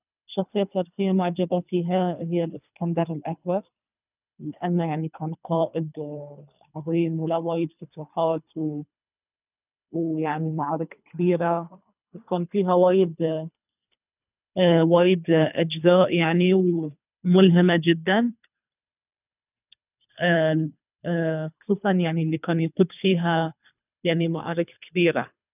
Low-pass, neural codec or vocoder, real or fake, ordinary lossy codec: 3.6 kHz; codec, 24 kHz, 3 kbps, HILCodec; fake; none